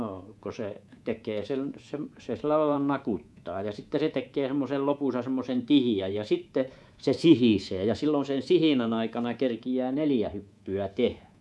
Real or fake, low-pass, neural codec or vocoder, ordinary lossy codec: fake; none; codec, 24 kHz, 3.1 kbps, DualCodec; none